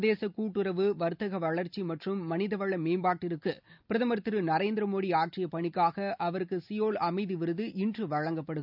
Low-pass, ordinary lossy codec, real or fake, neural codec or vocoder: 5.4 kHz; none; real; none